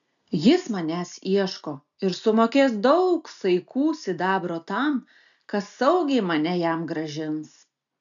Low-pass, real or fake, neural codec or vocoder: 7.2 kHz; real; none